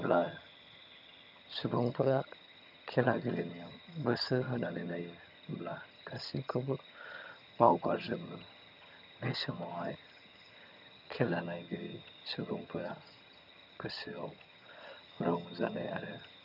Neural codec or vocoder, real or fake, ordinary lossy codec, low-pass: vocoder, 22.05 kHz, 80 mel bands, HiFi-GAN; fake; none; 5.4 kHz